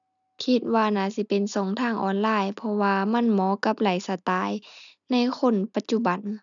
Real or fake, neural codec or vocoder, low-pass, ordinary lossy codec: real; none; 7.2 kHz; none